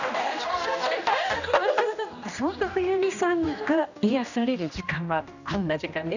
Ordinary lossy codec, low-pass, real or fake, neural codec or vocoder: none; 7.2 kHz; fake; codec, 16 kHz, 1 kbps, X-Codec, HuBERT features, trained on general audio